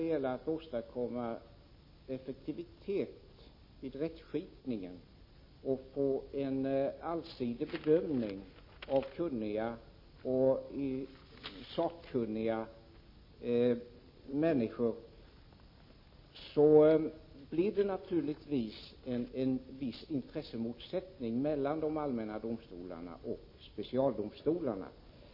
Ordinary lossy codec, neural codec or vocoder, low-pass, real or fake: MP3, 24 kbps; none; 5.4 kHz; real